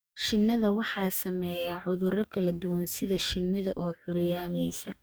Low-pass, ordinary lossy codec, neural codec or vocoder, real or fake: none; none; codec, 44.1 kHz, 2.6 kbps, DAC; fake